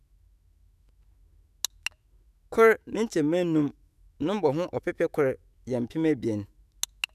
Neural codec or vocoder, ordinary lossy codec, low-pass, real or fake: autoencoder, 48 kHz, 128 numbers a frame, DAC-VAE, trained on Japanese speech; none; 14.4 kHz; fake